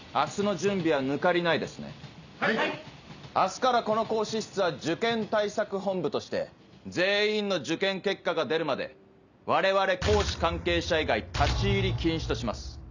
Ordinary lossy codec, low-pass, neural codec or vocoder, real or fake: none; 7.2 kHz; none; real